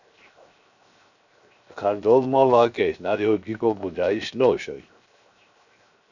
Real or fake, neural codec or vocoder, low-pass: fake; codec, 16 kHz, 0.7 kbps, FocalCodec; 7.2 kHz